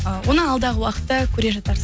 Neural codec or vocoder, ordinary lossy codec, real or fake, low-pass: none; none; real; none